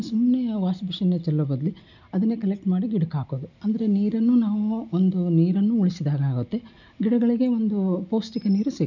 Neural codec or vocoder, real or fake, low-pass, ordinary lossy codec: none; real; 7.2 kHz; none